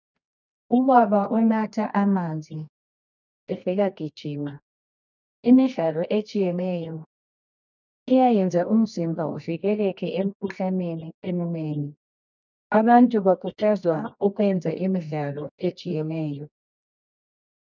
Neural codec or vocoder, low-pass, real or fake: codec, 24 kHz, 0.9 kbps, WavTokenizer, medium music audio release; 7.2 kHz; fake